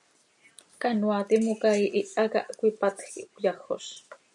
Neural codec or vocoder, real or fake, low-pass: none; real; 10.8 kHz